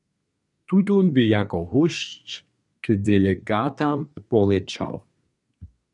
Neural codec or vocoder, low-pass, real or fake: codec, 24 kHz, 1 kbps, SNAC; 10.8 kHz; fake